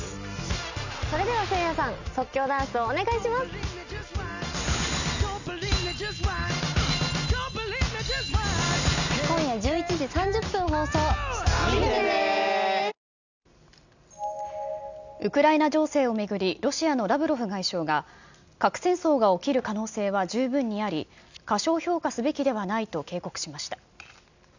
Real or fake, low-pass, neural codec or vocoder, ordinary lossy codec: real; 7.2 kHz; none; none